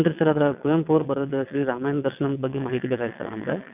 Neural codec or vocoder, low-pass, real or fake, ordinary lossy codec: vocoder, 22.05 kHz, 80 mel bands, WaveNeXt; 3.6 kHz; fake; none